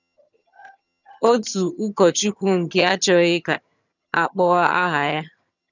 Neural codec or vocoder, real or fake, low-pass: vocoder, 22.05 kHz, 80 mel bands, HiFi-GAN; fake; 7.2 kHz